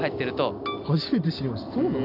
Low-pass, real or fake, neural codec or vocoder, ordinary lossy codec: 5.4 kHz; real; none; none